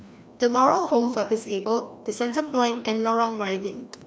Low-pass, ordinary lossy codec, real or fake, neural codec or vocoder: none; none; fake; codec, 16 kHz, 1 kbps, FreqCodec, larger model